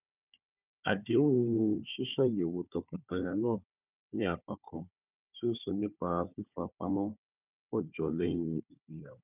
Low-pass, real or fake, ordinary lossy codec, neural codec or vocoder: 3.6 kHz; fake; none; codec, 16 kHz, 4 kbps, FunCodec, trained on Chinese and English, 50 frames a second